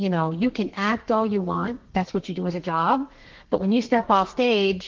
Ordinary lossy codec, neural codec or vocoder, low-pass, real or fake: Opus, 16 kbps; codec, 32 kHz, 1.9 kbps, SNAC; 7.2 kHz; fake